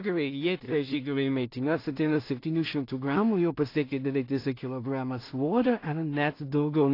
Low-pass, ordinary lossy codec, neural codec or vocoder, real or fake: 5.4 kHz; AAC, 32 kbps; codec, 16 kHz in and 24 kHz out, 0.4 kbps, LongCat-Audio-Codec, two codebook decoder; fake